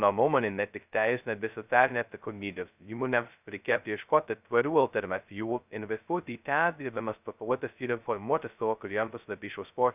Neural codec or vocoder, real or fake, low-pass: codec, 16 kHz, 0.2 kbps, FocalCodec; fake; 3.6 kHz